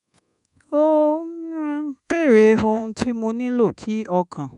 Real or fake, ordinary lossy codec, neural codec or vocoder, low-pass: fake; none; codec, 24 kHz, 1.2 kbps, DualCodec; 10.8 kHz